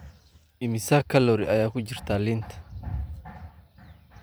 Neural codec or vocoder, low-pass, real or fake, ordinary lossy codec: vocoder, 44.1 kHz, 128 mel bands every 512 samples, BigVGAN v2; none; fake; none